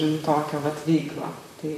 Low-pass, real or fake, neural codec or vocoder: 14.4 kHz; fake; vocoder, 44.1 kHz, 128 mel bands, Pupu-Vocoder